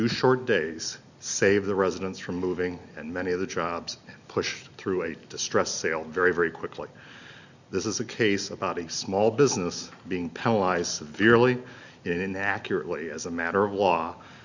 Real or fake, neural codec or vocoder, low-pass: real; none; 7.2 kHz